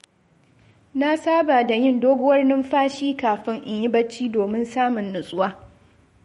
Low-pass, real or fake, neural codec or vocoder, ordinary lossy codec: 19.8 kHz; fake; autoencoder, 48 kHz, 128 numbers a frame, DAC-VAE, trained on Japanese speech; MP3, 48 kbps